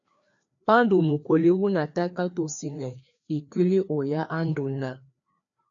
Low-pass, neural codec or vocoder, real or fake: 7.2 kHz; codec, 16 kHz, 2 kbps, FreqCodec, larger model; fake